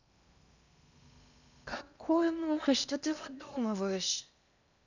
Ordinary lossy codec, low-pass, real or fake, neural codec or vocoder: none; 7.2 kHz; fake; codec, 16 kHz in and 24 kHz out, 0.8 kbps, FocalCodec, streaming, 65536 codes